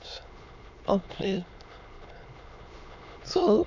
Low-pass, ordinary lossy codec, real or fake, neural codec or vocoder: 7.2 kHz; none; fake; autoencoder, 22.05 kHz, a latent of 192 numbers a frame, VITS, trained on many speakers